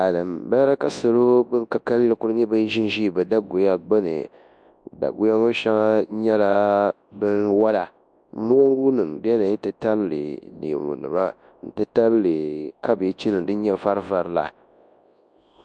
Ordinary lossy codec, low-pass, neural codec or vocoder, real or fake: MP3, 64 kbps; 9.9 kHz; codec, 24 kHz, 0.9 kbps, WavTokenizer, large speech release; fake